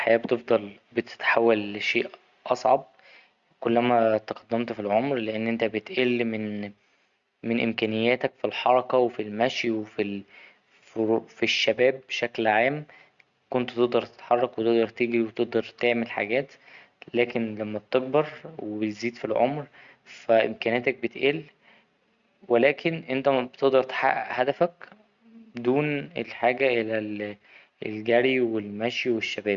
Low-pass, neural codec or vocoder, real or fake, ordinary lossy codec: 7.2 kHz; none; real; Opus, 64 kbps